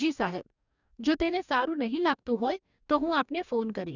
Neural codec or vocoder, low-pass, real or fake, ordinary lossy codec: codec, 44.1 kHz, 2.6 kbps, DAC; 7.2 kHz; fake; none